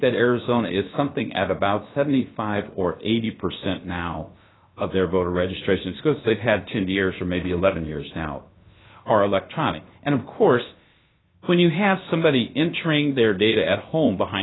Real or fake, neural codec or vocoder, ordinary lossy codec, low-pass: fake; codec, 16 kHz, about 1 kbps, DyCAST, with the encoder's durations; AAC, 16 kbps; 7.2 kHz